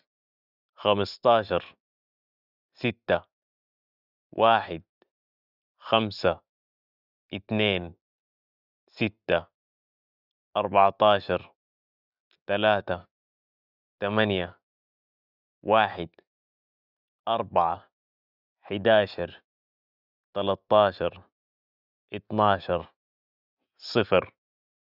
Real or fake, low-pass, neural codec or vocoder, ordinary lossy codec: real; 5.4 kHz; none; none